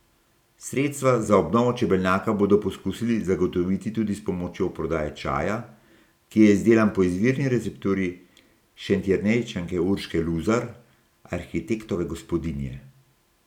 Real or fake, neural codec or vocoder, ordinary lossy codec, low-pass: real; none; none; 19.8 kHz